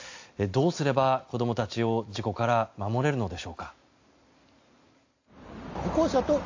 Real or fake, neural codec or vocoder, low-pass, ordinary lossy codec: real; none; 7.2 kHz; AAC, 48 kbps